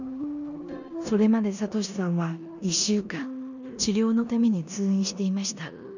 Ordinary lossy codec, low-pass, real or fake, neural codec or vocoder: none; 7.2 kHz; fake; codec, 16 kHz in and 24 kHz out, 0.9 kbps, LongCat-Audio-Codec, four codebook decoder